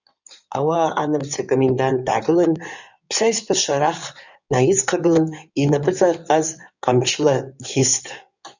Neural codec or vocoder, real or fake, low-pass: codec, 16 kHz in and 24 kHz out, 2.2 kbps, FireRedTTS-2 codec; fake; 7.2 kHz